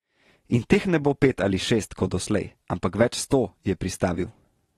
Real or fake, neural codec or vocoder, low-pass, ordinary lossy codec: real; none; 19.8 kHz; AAC, 32 kbps